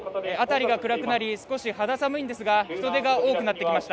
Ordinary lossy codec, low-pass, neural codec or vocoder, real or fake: none; none; none; real